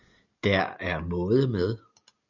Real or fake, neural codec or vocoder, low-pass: real; none; 7.2 kHz